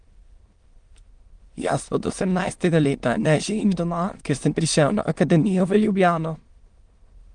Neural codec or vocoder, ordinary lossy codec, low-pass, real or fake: autoencoder, 22.05 kHz, a latent of 192 numbers a frame, VITS, trained on many speakers; Opus, 24 kbps; 9.9 kHz; fake